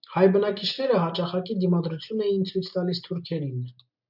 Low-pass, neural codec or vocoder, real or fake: 5.4 kHz; none; real